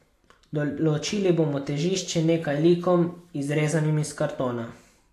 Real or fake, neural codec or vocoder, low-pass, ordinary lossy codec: real; none; 14.4 kHz; AAC, 64 kbps